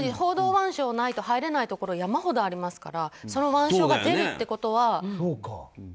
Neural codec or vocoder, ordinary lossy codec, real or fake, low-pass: none; none; real; none